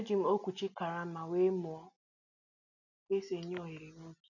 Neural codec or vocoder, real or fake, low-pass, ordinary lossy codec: none; real; 7.2 kHz; none